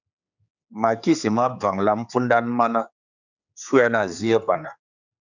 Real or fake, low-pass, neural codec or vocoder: fake; 7.2 kHz; codec, 16 kHz, 4 kbps, X-Codec, HuBERT features, trained on general audio